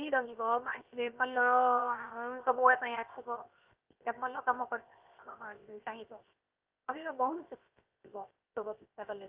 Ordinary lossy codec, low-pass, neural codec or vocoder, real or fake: Opus, 32 kbps; 3.6 kHz; codec, 16 kHz, 0.7 kbps, FocalCodec; fake